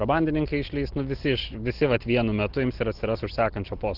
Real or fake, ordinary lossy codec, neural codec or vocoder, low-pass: real; Opus, 16 kbps; none; 5.4 kHz